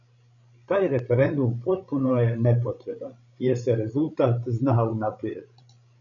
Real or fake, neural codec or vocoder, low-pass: fake; codec, 16 kHz, 16 kbps, FreqCodec, larger model; 7.2 kHz